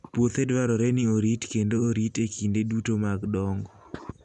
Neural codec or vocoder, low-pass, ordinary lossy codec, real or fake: none; 10.8 kHz; none; real